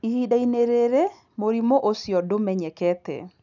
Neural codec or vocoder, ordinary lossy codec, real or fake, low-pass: none; none; real; 7.2 kHz